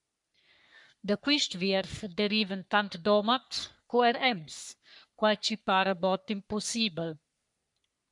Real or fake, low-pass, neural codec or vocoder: fake; 10.8 kHz; codec, 44.1 kHz, 3.4 kbps, Pupu-Codec